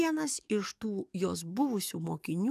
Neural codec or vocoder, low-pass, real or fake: codec, 44.1 kHz, 7.8 kbps, DAC; 14.4 kHz; fake